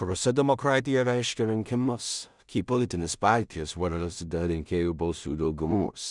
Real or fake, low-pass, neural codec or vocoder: fake; 10.8 kHz; codec, 16 kHz in and 24 kHz out, 0.4 kbps, LongCat-Audio-Codec, two codebook decoder